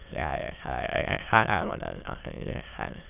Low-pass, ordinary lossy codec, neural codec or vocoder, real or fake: 3.6 kHz; none; autoencoder, 22.05 kHz, a latent of 192 numbers a frame, VITS, trained on many speakers; fake